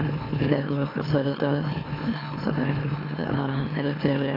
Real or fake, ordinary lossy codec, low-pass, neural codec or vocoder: fake; AAC, 24 kbps; 5.4 kHz; autoencoder, 44.1 kHz, a latent of 192 numbers a frame, MeloTTS